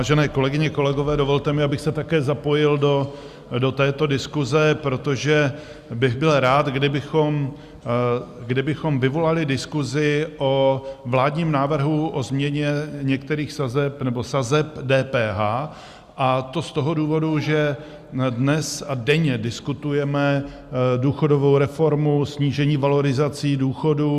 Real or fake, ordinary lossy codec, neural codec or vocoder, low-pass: real; Opus, 64 kbps; none; 14.4 kHz